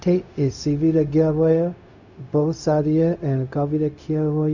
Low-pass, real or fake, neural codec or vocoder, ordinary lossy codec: 7.2 kHz; fake; codec, 16 kHz, 0.4 kbps, LongCat-Audio-Codec; none